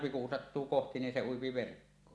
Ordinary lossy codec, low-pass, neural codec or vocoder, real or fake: none; none; none; real